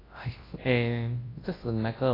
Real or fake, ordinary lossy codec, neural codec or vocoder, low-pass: fake; AAC, 24 kbps; codec, 24 kHz, 0.9 kbps, WavTokenizer, large speech release; 5.4 kHz